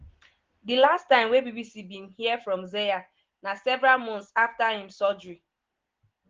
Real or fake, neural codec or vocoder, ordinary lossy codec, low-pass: real; none; Opus, 16 kbps; 7.2 kHz